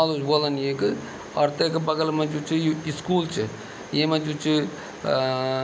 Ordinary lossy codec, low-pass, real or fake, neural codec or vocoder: none; none; real; none